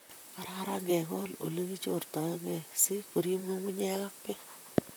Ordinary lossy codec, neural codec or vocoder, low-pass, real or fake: none; vocoder, 44.1 kHz, 128 mel bands, Pupu-Vocoder; none; fake